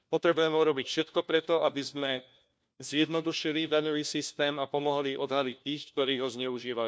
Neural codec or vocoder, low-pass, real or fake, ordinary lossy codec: codec, 16 kHz, 1 kbps, FunCodec, trained on LibriTTS, 50 frames a second; none; fake; none